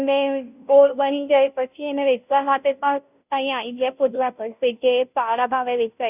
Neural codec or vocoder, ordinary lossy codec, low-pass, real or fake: codec, 16 kHz, 0.5 kbps, FunCodec, trained on Chinese and English, 25 frames a second; none; 3.6 kHz; fake